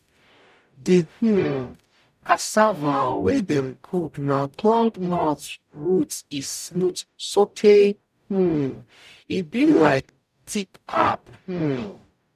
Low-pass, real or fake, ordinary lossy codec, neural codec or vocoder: 14.4 kHz; fake; none; codec, 44.1 kHz, 0.9 kbps, DAC